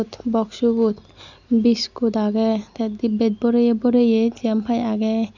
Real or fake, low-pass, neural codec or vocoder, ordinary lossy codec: real; 7.2 kHz; none; none